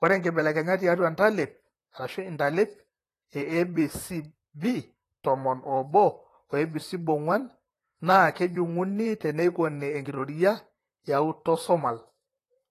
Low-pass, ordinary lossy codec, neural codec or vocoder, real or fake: 14.4 kHz; AAC, 48 kbps; vocoder, 44.1 kHz, 128 mel bands, Pupu-Vocoder; fake